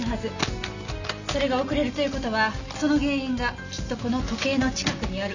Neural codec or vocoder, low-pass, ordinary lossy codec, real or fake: none; 7.2 kHz; none; real